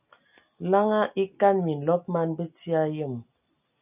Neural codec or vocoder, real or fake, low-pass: none; real; 3.6 kHz